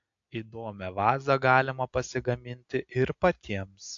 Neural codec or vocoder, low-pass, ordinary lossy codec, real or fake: none; 7.2 kHz; AAC, 64 kbps; real